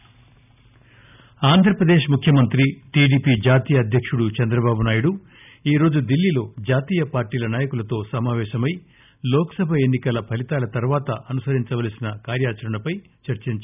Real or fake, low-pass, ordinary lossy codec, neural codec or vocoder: real; 3.6 kHz; none; none